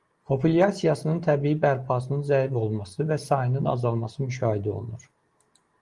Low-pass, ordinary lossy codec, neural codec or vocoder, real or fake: 10.8 kHz; Opus, 24 kbps; none; real